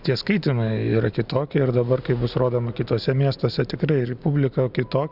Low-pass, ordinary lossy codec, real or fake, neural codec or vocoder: 5.4 kHz; Opus, 64 kbps; real; none